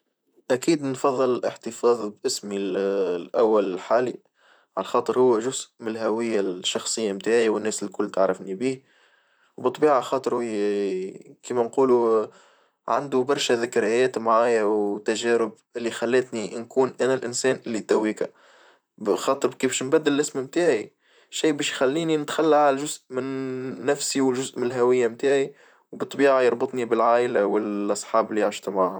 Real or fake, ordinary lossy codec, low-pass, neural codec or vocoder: fake; none; none; vocoder, 44.1 kHz, 128 mel bands, Pupu-Vocoder